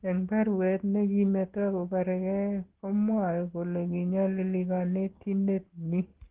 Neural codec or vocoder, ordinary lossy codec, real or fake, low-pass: none; Opus, 16 kbps; real; 3.6 kHz